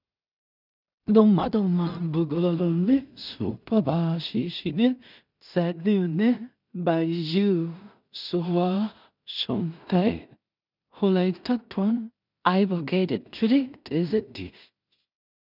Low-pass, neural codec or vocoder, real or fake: 5.4 kHz; codec, 16 kHz in and 24 kHz out, 0.4 kbps, LongCat-Audio-Codec, two codebook decoder; fake